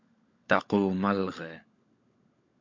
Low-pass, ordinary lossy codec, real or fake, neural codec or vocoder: 7.2 kHz; MP3, 48 kbps; fake; codec, 16 kHz, 16 kbps, FunCodec, trained on LibriTTS, 50 frames a second